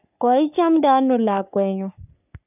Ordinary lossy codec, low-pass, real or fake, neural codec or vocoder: none; 3.6 kHz; fake; codec, 44.1 kHz, 3.4 kbps, Pupu-Codec